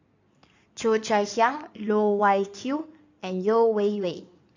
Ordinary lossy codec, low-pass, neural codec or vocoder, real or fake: AAC, 48 kbps; 7.2 kHz; codec, 16 kHz in and 24 kHz out, 2.2 kbps, FireRedTTS-2 codec; fake